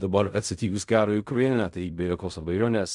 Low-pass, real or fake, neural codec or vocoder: 10.8 kHz; fake; codec, 16 kHz in and 24 kHz out, 0.4 kbps, LongCat-Audio-Codec, fine tuned four codebook decoder